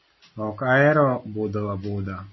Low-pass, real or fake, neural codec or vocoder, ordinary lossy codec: 7.2 kHz; real; none; MP3, 24 kbps